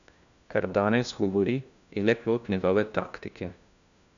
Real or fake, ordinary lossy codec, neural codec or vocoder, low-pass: fake; none; codec, 16 kHz, 1 kbps, FunCodec, trained on LibriTTS, 50 frames a second; 7.2 kHz